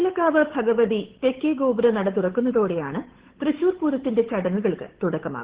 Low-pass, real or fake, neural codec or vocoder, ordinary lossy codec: 3.6 kHz; fake; codec, 16 kHz, 8 kbps, FunCodec, trained on Chinese and English, 25 frames a second; Opus, 16 kbps